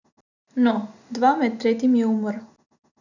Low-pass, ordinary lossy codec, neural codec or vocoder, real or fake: 7.2 kHz; none; none; real